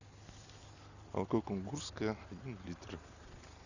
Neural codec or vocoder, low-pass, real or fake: none; 7.2 kHz; real